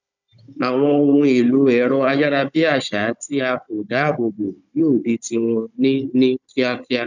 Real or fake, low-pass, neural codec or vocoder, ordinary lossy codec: fake; 7.2 kHz; codec, 16 kHz, 16 kbps, FunCodec, trained on Chinese and English, 50 frames a second; none